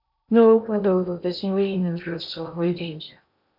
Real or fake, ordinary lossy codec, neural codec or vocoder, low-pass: fake; none; codec, 16 kHz in and 24 kHz out, 0.8 kbps, FocalCodec, streaming, 65536 codes; 5.4 kHz